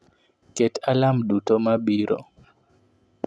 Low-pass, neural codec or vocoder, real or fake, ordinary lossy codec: none; none; real; none